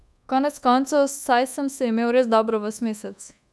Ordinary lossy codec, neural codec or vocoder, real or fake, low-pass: none; codec, 24 kHz, 1.2 kbps, DualCodec; fake; none